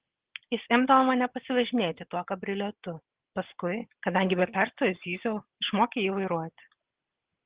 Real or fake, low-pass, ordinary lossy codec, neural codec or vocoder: real; 3.6 kHz; Opus, 16 kbps; none